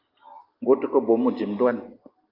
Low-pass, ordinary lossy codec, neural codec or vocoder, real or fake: 5.4 kHz; Opus, 24 kbps; none; real